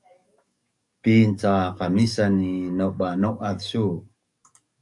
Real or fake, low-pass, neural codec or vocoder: fake; 10.8 kHz; codec, 44.1 kHz, 7.8 kbps, DAC